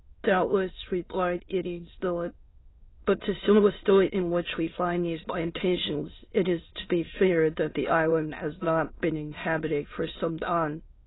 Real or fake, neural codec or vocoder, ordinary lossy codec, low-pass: fake; autoencoder, 22.05 kHz, a latent of 192 numbers a frame, VITS, trained on many speakers; AAC, 16 kbps; 7.2 kHz